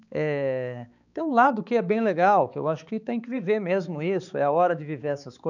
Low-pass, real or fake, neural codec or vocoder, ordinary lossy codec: 7.2 kHz; fake; codec, 16 kHz, 4 kbps, X-Codec, HuBERT features, trained on balanced general audio; none